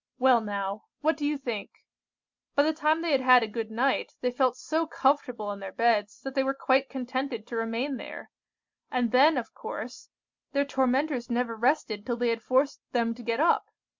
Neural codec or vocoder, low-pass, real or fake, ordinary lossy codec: none; 7.2 kHz; real; MP3, 64 kbps